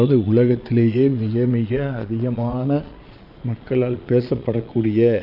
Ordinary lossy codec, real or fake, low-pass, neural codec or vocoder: AAC, 48 kbps; fake; 5.4 kHz; vocoder, 22.05 kHz, 80 mel bands, Vocos